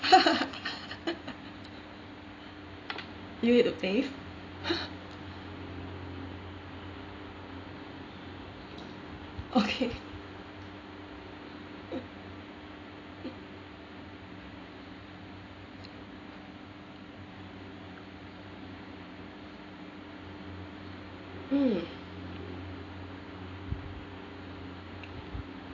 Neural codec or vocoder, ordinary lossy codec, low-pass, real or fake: none; AAC, 32 kbps; 7.2 kHz; real